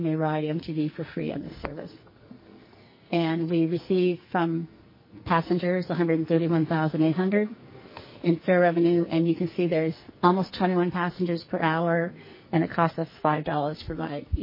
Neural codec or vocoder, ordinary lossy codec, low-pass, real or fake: codec, 44.1 kHz, 2.6 kbps, SNAC; MP3, 24 kbps; 5.4 kHz; fake